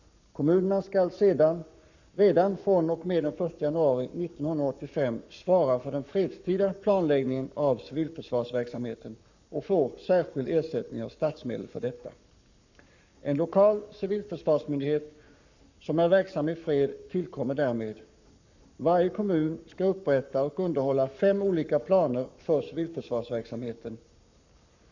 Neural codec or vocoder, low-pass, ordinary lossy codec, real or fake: codec, 44.1 kHz, 7.8 kbps, Pupu-Codec; 7.2 kHz; none; fake